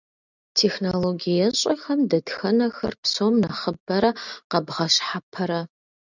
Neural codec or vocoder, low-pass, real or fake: none; 7.2 kHz; real